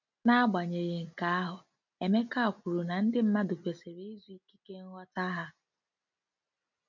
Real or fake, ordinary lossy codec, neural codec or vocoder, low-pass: real; none; none; 7.2 kHz